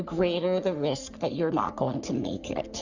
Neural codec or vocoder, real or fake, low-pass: codec, 44.1 kHz, 3.4 kbps, Pupu-Codec; fake; 7.2 kHz